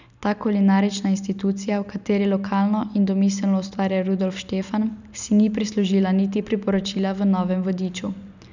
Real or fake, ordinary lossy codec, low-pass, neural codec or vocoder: real; none; 7.2 kHz; none